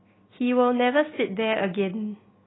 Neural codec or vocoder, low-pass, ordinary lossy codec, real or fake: none; 7.2 kHz; AAC, 16 kbps; real